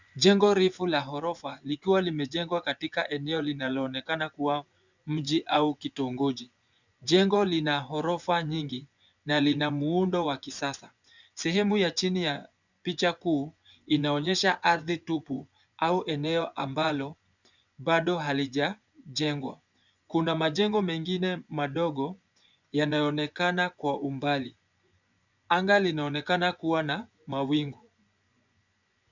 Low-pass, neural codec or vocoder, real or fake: 7.2 kHz; vocoder, 24 kHz, 100 mel bands, Vocos; fake